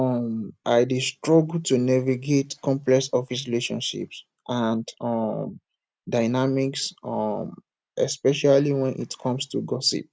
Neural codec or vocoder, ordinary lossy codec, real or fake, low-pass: none; none; real; none